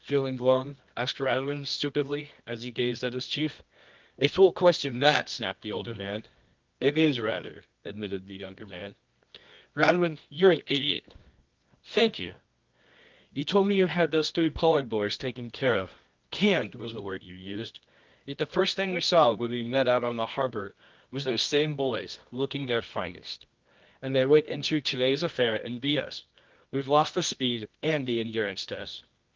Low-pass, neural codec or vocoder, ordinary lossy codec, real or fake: 7.2 kHz; codec, 24 kHz, 0.9 kbps, WavTokenizer, medium music audio release; Opus, 32 kbps; fake